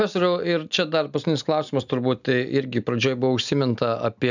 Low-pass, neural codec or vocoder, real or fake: 7.2 kHz; none; real